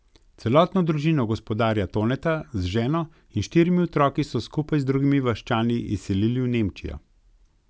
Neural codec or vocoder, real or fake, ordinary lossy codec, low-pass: none; real; none; none